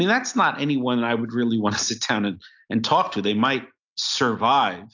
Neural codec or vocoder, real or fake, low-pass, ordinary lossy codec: none; real; 7.2 kHz; AAC, 48 kbps